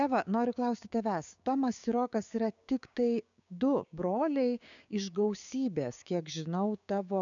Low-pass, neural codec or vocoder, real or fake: 7.2 kHz; codec, 16 kHz, 6 kbps, DAC; fake